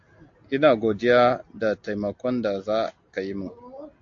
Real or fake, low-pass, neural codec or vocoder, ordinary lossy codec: real; 7.2 kHz; none; AAC, 48 kbps